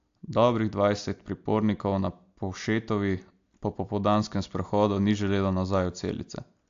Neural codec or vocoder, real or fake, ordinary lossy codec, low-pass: none; real; AAC, 64 kbps; 7.2 kHz